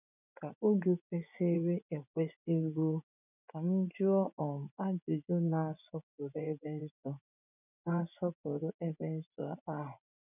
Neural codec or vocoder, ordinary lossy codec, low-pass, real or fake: vocoder, 24 kHz, 100 mel bands, Vocos; none; 3.6 kHz; fake